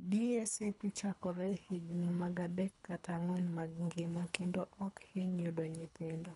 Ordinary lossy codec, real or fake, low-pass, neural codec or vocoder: none; fake; 10.8 kHz; codec, 24 kHz, 3 kbps, HILCodec